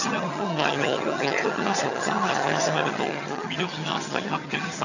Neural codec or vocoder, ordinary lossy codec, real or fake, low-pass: vocoder, 22.05 kHz, 80 mel bands, HiFi-GAN; none; fake; 7.2 kHz